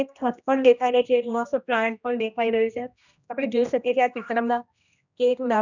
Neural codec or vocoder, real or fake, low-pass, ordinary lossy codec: codec, 16 kHz, 1 kbps, X-Codec, HuBERT features, trained on general audio; fake; 7.2 kHz; none